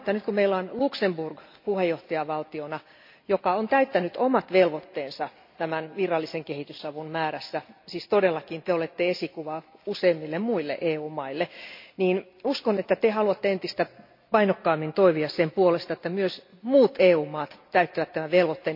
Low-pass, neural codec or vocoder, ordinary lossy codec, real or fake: 5.4 kHz; none; MP3, 32 kbps; real